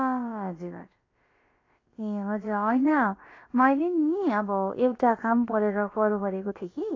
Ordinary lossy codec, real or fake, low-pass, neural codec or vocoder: AAC, 32 kbps; fake; 7.2 kHz; codec, 16 kHz, about 1 kbps, DyCAST, with the encoder's durations